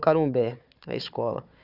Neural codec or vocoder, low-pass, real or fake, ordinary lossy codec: codec, 16 kHz, 4 kbps, FunCodec, trained on Chinese and English, 50 frames a second; 5.4 kHz; fake; none